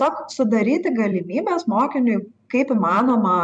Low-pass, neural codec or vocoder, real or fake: 9.9 kHz; vocoder, 44.1 kHz, 128 mel bands every 256 samples, BigVGAN v2; fake